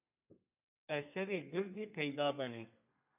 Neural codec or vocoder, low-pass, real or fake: codec, 32 kHz, 1.9 kbps, SNAC; 3.6 kHz; fake